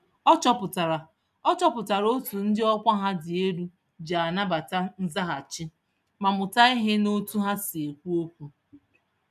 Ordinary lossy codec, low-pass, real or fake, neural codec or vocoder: none; 14.4 kHz; real; none